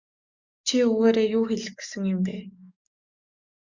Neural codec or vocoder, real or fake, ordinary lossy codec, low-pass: codec, 44.1 kHz, 7.8 kbps, DAC; fake; Opus, 64 kbps; 7.2 kHz